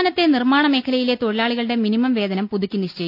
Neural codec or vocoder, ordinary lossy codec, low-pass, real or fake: none; none; 5.4 kHz; real